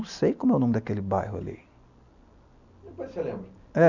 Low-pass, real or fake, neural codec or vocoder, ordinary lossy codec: 7.2 kHz; real; none; none